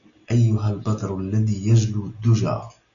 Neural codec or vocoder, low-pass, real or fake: none; 7.2 kHz; real